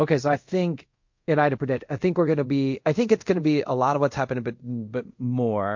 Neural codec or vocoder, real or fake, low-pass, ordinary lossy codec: codec, 24 kHz, 0.5 kbps, DualCodec; fake; 7.2 kHz; MP3, 48 kbps